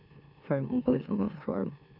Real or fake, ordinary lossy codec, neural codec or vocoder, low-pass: fake; none; autoencoder, 44.1 kHz, a latent of 192 numbers a frame, MeloTTS; 5.4 kHz